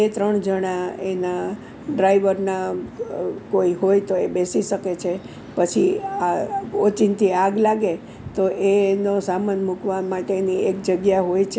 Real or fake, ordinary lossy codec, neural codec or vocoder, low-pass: real; none; none; none